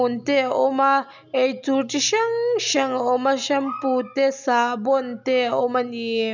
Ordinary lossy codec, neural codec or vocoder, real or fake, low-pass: none; none; real; 7.2 kHz